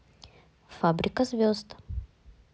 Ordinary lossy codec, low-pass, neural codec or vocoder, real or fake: none; none; none; real